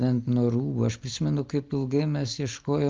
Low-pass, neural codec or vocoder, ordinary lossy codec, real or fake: 7.2 kHz; none; Opus, 24 kbps; real